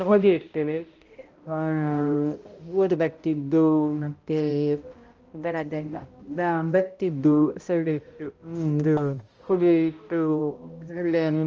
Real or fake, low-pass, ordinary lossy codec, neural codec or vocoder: fake; 7.2 kHz; Opus, 24 kbps; codec, 16 kHz, 0.5 kbps, X-Codec, HuBERT features, trained on balanced general audio